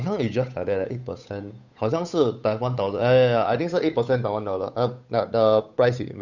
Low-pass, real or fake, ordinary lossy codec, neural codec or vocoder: 7.2 kHz; fake; none; codec, 16 kHz, 8 kbps, FunCodec, trained on Chinese and English, 25 frames a second